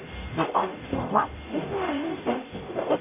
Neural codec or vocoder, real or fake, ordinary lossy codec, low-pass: codec, 44.1 kHz, 0.9 kbps, DAC; fake; none; 3.6 kHz